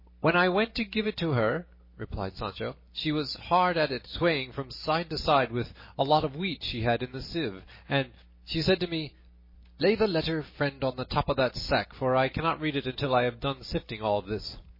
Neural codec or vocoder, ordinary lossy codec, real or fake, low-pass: none; MP3, 24 kbps; real; 5.4 kHz